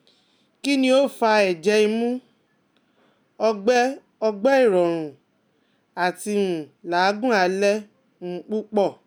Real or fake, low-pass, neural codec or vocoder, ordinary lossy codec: real; none; none; none